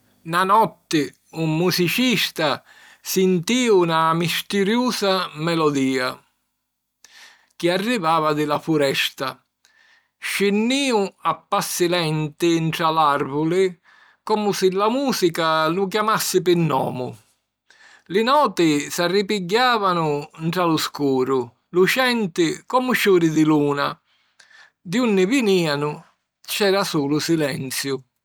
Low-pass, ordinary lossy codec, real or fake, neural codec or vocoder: none; none; real; none